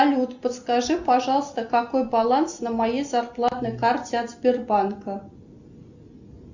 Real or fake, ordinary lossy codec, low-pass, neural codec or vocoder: real; Opus, 64 kbps; 7.2 kHz; none